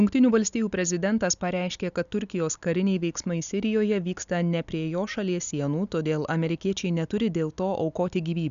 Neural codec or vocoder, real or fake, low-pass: none; real; 7.2 kHz